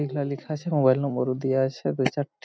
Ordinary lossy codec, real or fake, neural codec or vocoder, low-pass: none; real; none; none